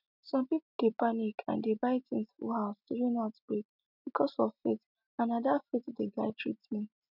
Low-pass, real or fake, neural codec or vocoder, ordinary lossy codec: 5.4 kHz; real; none; none